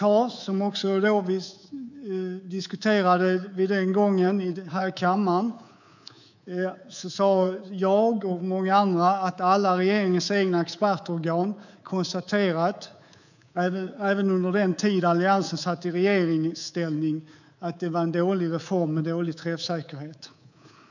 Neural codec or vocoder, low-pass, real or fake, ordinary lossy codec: codec, 24 kHz, 3.1 kbps, DualCodec; 7.2 kHz; fake; none